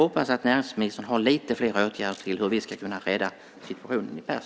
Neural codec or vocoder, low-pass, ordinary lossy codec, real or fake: none; none; none; real